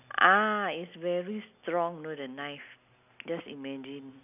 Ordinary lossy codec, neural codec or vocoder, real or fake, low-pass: none; none; real; 3.6 kHz